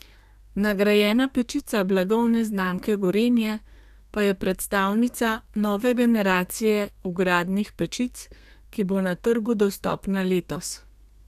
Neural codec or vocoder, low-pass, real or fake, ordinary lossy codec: codec, 32 kHz, 1.9 kbps, SNAC; 14.4 kHz; fake; none